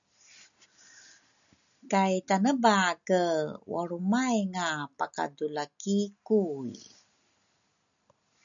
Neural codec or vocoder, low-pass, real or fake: none; 7.2 kHz; real